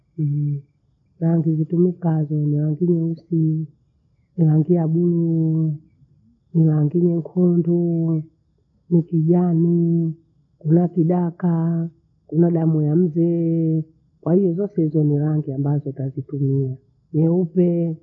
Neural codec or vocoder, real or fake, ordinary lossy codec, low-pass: none; real; none; 7.2 kHz